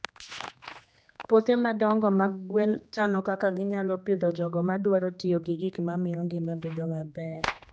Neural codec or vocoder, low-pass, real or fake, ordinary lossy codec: codec, 16 kHz, 2 kbps, X-Codec, HuBERT features, trained on general audio; none; fake; none